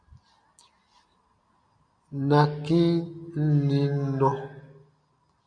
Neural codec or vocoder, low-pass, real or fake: none; 9.9 kHz; real